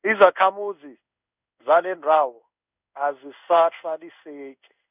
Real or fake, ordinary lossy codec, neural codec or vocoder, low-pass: fake; none; codec, 16 kHz in and 24 kHz out, 1 kbps, XY-Tokenizer; 3.6 kHz